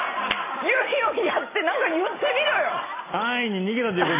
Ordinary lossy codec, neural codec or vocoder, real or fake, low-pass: AAC, 16 kbps; none; real; 3.6 kHz